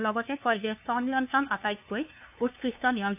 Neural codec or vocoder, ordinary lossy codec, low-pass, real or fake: codec, 16 kHz, 2 kbps, FunCodec, trained on LibriTTS, 25 frames a second; AAC, 32 kbps; 3.6 kHz; fake